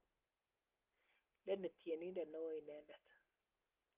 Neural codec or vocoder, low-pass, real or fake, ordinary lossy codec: none; 3.6 kHz; real; Opus, 24 kbps